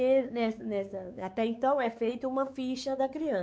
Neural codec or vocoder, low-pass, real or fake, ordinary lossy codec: codec, 16 kHz, 4 kbps, X-Codec, WavLM features, trained on Multilingual LibriSpeech; none; fake; none